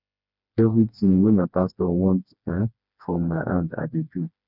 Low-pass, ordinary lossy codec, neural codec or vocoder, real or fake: 5.4 kHz; none; codec, 16 kHz, 2 kbps, FreqCodec, smaller model; fake